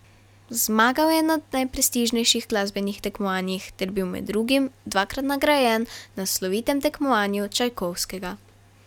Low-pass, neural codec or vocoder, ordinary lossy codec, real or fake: 19.8 kHz; none; none; real